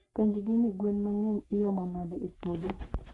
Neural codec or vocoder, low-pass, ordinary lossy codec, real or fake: codec, 44.1 kHz, 3.4 kbps, Pupu-Codec; 10.8 kHz; AAC, 48 kbps; fake